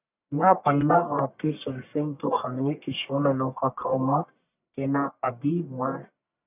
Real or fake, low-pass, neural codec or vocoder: fake; 3.6 kHz; codec, 44.1 kHz, 1.7 kbps, Pupu-Codec